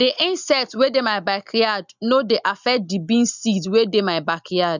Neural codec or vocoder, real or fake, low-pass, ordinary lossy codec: none; real; 7.2 kHz; none